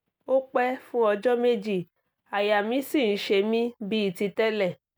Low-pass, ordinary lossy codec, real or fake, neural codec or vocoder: none; none; real; none